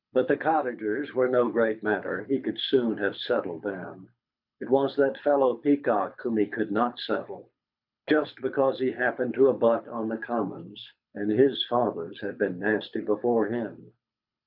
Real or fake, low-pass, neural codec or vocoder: fake; 5.4 kHz; codec, 24 kHz, 6 kbps, HILCodec